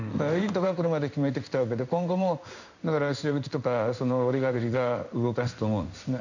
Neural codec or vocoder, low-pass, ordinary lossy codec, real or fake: codec, 16 kHz in and 24 kHz out, 1 kbps, XY-Tokenizer; 7.2 kHz; none; fake